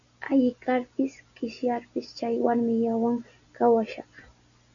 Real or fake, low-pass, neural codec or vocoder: real; 7.2 kHz; none